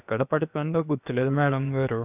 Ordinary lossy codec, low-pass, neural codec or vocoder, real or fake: none; 3.6 kHz; codec, 16 kHz, about 1 kbps, DyCAST, with the encoder's durations; fake